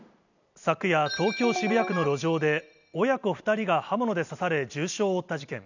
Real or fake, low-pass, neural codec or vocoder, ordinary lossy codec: fake; 7.2 kHz; vocoder, 44.1 kHz, 128 mel bands every 256 samples, BigVGAN v2; none